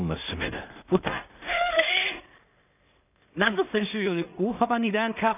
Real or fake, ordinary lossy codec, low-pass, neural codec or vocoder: fake; none; 3.6 kHz; codec, 16 kHz in and 24 kHz out, 0.4 kbps, LongCat-Audio-Codec, two codebook decoder